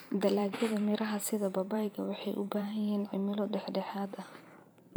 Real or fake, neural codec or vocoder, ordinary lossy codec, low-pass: fake; vocoder, 44.1 kHz, 128 mel bands every 512 samples, BigVGAN v2; none; none